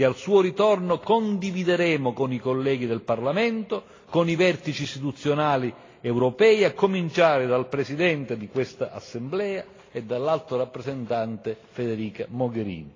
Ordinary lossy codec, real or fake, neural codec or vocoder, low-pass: AAC, 32 kbps; real; none; 7.2 kHz